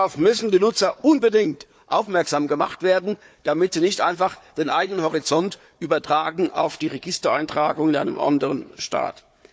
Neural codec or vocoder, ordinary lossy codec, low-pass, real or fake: codec, 16 kHz, 4 kbps, FunCodec, trained on Chinese and English, 50 frames a second; none; none; fake